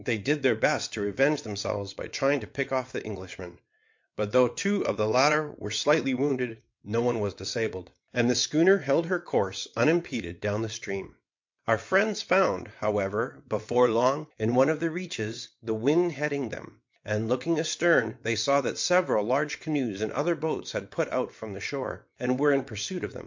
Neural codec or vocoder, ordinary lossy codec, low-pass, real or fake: vocoder, 22.05 kHz, 80 mel bands, WaveNeXt; MP3, 48 kbps; 7.2 kHz; fake